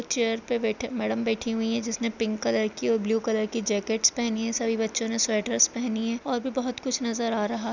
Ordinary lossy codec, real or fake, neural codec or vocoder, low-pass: none; real; none; 7.2 kHz